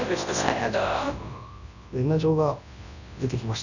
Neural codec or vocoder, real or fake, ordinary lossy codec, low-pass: codec, 24 kHz, 0.9 kbps, WavTokenizer, large speech release; fake; none; 7.2 kHz